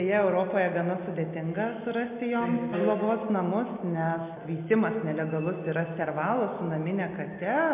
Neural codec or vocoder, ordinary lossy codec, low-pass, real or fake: none; MP3, 32 kbps; 3.6 kHz; real